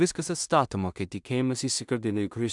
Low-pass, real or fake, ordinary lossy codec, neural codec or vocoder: 10.8 kHz; fake; MP3, 96 kbps; codec, 16 kHz in and 24 kHz out, 0.4 kbps, LongCat-Audio-Codec, two codebook decoder